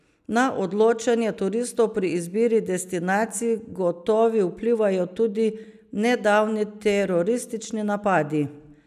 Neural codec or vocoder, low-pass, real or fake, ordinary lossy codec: none; 14.4 kHz; real; none